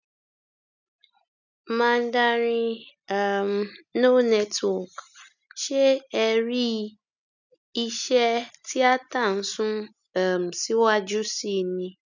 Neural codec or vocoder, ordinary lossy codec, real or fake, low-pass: none; none; real; 7.2 kHz